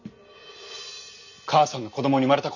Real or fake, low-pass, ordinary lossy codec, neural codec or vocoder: real; 7.2 kHz; none; none